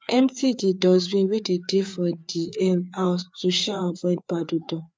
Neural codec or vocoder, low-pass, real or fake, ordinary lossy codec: codec, 16 kHz, 4 kbps, FreqCodec, larger model; none; fake; none